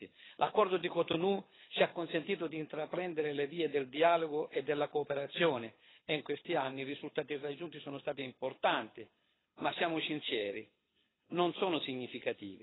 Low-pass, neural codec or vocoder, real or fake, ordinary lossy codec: 7.2 kHz; codec, 24 kHz, 6 kbps, HILCodec; fake; AAC, 16 kbps